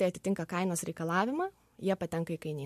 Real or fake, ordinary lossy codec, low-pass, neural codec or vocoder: real; MP3, 64 kbps; 14.4 kHz; none